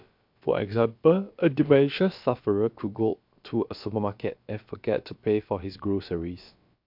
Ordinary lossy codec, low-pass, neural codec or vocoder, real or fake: MP3, 48 kbps; 5.4 kHz; codec, 16 kHz, about 1 kbps, DyCAST, with the encoder's durations; fake